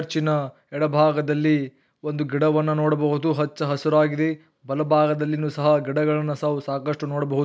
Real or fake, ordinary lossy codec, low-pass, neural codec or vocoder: real; none; none; none